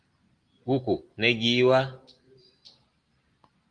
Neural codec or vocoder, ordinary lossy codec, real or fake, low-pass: none; Opus, 24 kbps; real; 9.9 kHz